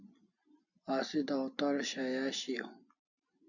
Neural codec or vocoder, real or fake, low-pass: none; real; 7.2 kHz